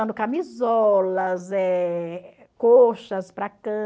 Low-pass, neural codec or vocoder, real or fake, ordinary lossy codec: none; none; real; none